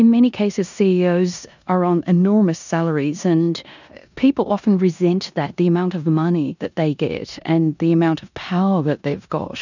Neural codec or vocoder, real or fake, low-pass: codec, 16 kHz in and 24 kHz out, 0.9 kbps, LongCat-Audio-Codec, four codebook decoder; fake; 7.2 kHz